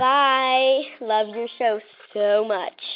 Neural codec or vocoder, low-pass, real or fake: none; 5.4 kHz; real